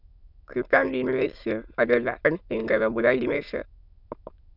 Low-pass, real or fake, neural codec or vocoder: 5.4 kHz; fake; autoencoder, 22.05 kHz, a latent of 192 numbers a frame, VITS, trained on many speakers